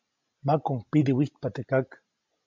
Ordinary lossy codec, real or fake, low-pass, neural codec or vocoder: MP3, 64 kbps; real; 7.2 kHz; none